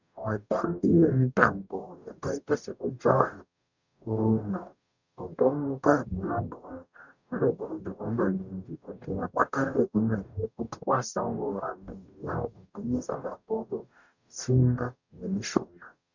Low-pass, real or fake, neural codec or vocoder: 7.2 kHz; fake; codec, 44.1 kHz, 0.9 kbps, DAC